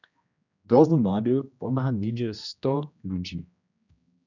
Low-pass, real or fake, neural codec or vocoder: 7.2 kHz; fake; codec, 16 kHz, 1 kbps, X-Codec, HuBERT features, trained on general audio